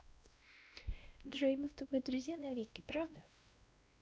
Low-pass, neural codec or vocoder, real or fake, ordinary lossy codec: none; codec, 16 kHz, 1 kbps, X-Codec, WavLM features, trained on Multilingual LibriSpeech; fake; none